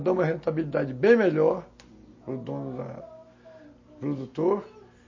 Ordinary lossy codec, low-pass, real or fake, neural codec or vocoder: MP3, 32 kbps; 7.2 kHz; real; none